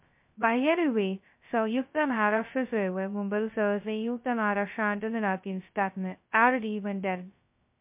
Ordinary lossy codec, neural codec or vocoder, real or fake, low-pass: MP3, 32 kbps; codec, 16 kHz, 0.2 kbps, FocalCodec; fake; 3.6 kHz